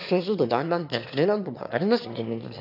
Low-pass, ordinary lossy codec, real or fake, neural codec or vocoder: 5.4 kHz; AAC, 48 kbps; fake; autoencoder, 22.05 kHz, a latent of 192 numbers a frame, VITS, trained on one speaker